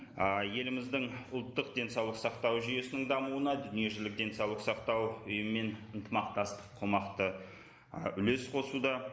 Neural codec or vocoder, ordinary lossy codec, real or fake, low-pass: none; none; real; none